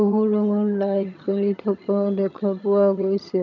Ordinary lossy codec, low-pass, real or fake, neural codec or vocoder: none; 7.2 kHz; fake; vocoder, 22.05 kHz, 80 mel bands, HiFi-GAN